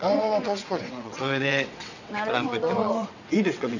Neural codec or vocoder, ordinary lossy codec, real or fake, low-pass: vocoder, 22.05 kHz, 80 mel bands, WaveNeXt; none; fake; 7.2 kHz